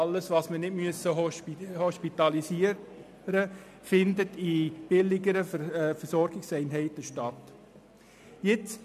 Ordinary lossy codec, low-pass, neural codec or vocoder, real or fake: MP3, 96 kbps; 14.4 kHz; none; real